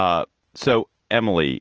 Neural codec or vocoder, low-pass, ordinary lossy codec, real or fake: none; 7.2 kHz; Opus, 16 kbps; real